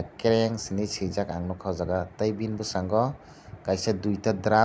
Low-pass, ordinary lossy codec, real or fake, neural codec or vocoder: none; none; real; none